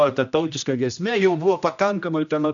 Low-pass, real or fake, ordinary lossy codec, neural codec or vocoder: 7.2 kHz; fake; MP3, 96 kbps; codec, 16 kHz, 1 kbps, X-Codec, HuBERT features, trained on general audio